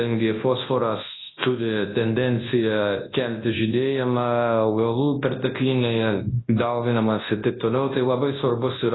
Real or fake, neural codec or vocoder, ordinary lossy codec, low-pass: fake; codec, 24 kHz, 0.9 kbps, WavTokenizer, large speech release; AAC, 16 kbps; 7.2 kHz